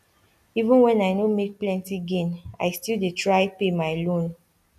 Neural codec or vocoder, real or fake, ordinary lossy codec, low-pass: none; real; none; 14.4 kHz